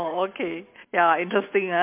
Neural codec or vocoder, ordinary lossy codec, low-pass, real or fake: none; MP3, 32 kbps; 3.6 kHz; real